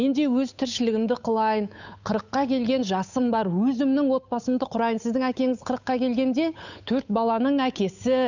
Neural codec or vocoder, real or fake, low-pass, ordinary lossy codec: codec, 16 kHz, 8 kbps, FunCodec, trained on Chinese and English, 25 frames a second; fake; 7.2 kHz; none